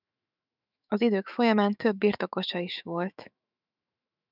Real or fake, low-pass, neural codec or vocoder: fake; 5.4 kHz; autoencoder, 48 kHz, 128 numbers a frame, DAC-VAE, trained on Japanese speech